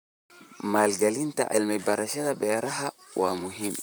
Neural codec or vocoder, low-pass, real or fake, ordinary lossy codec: vocoder, 44.1 kHz, 128 mel bands, Pupu-Vocoder; none; fake; none